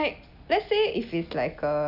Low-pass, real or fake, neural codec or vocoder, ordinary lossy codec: 5.4 kHz; real; none; none